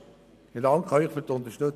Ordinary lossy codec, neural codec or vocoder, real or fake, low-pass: none; none; real; 14.4 kHz